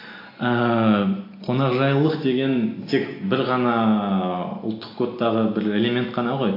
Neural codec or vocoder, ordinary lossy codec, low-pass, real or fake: none; AAC, 32 kbps; 5.4 kHz; real